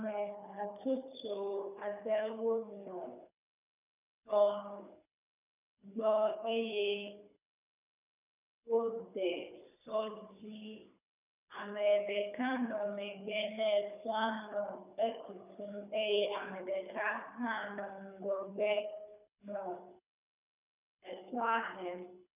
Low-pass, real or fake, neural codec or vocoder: 3.6 kHz; fake; codec, 24 kHz, 3 kbps, HILCodec